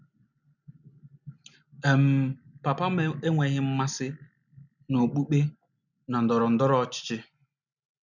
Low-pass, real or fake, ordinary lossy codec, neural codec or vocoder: 7.2 kHz; real; none; none